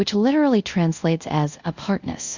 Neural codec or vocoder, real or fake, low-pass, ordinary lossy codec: codec, 24 kHz, 0.5 kbps, DualCodec; fake; 7.2 kHz; Opus, 64 kbps